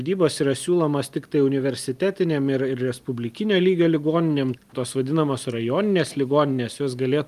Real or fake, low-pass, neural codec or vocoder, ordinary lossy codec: real; 14.4 kHz; none; Opus, 32 kbps